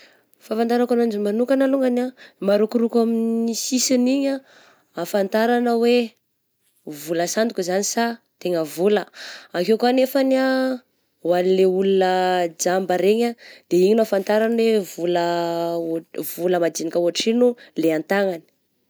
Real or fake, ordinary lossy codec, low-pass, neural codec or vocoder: real; none; none; none